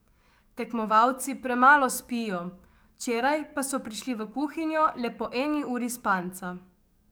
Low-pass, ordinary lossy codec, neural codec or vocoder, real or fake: none; none; codec, 44.1 kHz, 7.8 kbps, DAC; fake